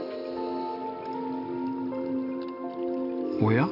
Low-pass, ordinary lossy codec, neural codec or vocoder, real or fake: 5.4 kHz; none; none; real